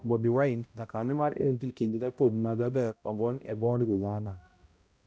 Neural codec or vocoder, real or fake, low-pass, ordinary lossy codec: codec, 16 kHz, 0.5 kbps, X-Codec, HuBERT features, trained on balanced general audio; fake; none; none